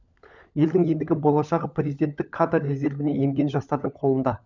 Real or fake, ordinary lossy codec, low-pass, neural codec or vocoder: fake; none; 7.2 kHz; codec, 16 kHz, 16 kbps, FunCodec, trained on LibriTTS, 50 frames a second